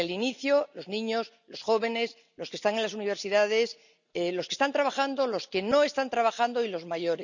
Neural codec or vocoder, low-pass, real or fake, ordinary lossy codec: none; 7.2 kHz; real; none